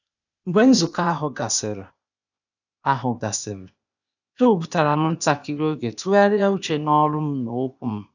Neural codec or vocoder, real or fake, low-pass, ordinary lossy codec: codec, 16 kHz, 0.8 kbps, ZipCodec; fake; 7.2 kHz; none